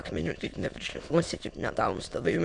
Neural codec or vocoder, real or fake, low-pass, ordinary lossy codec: autoencoder, 22.05 kHz, a latent of 192 numbers a frame, VITS, trained on many speakers; fake; 9.9 kHz; MP3, 64 kbps